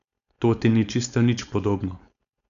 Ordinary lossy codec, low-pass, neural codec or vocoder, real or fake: none; 7.2 kHz; codec, 16 kHz, 4.8 kbps, FACodec; fake